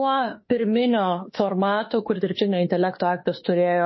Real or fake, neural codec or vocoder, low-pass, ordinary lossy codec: fake; autoencoder, 48 kHz, 32 numbers a frame, DAC-VAE, trained on Japanese speech; 7.2 kHz; MP3, 24 kbps